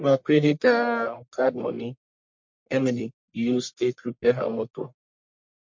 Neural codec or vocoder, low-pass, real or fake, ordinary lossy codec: codec, 44.1 kHz, 1.7 kbps, Pupu-Codec; 7.2 kHz; fake; MP3, 48 kbps